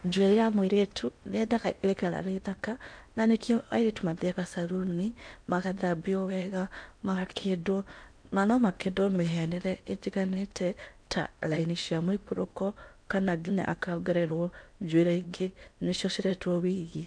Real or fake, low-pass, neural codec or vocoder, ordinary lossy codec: fake; 9.9 kHz; codec, 16 kHz in and 24 kHz out, 0.8 kbps, FocalCodec, streaming, 65536 codes; MP3, 64 kbps